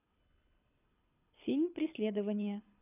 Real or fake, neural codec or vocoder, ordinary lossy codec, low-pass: fake; codec, 24 kHz, 6 kbps, HILCodec; none; 3.6 kHz